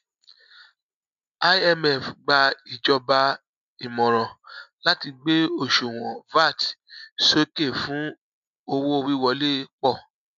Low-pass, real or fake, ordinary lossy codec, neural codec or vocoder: 7.2 kHz; real; none; none